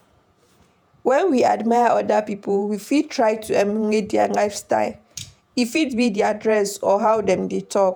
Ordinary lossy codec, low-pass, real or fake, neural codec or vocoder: none; none; fake; vocoder, 48 kHz, 128 mel bands, Vocos